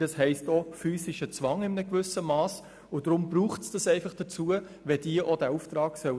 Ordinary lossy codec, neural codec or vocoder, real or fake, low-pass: none; none; real; none